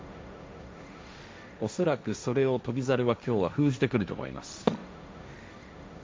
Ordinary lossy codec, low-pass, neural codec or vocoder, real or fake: none; none; codec, 16 kHz, 1.1 kbps, Voila-Tokenizer; fake